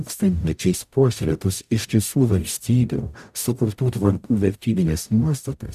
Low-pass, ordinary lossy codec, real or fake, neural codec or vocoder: 14.4 kHz; AAC, 96 kbps; fake; codec, 44.1 kHz, 0.9 kbps, DAC